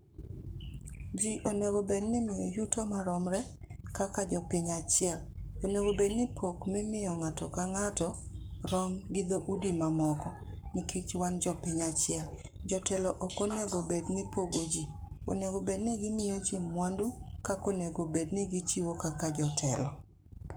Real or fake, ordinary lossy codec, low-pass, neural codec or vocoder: fake; none; none; codec, 44.1 kHz, 7.8 kbps, Pupu-Codec